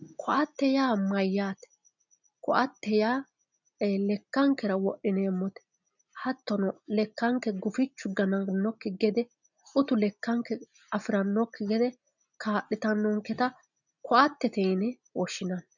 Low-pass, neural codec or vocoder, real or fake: 7.2 kHz; none; real